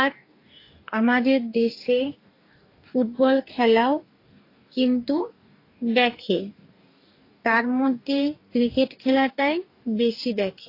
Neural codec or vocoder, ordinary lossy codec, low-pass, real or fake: codec, 44.1 kHz, 2.6 kbps, DAC; AAC, 32 kbps; 5.4 kHz; fake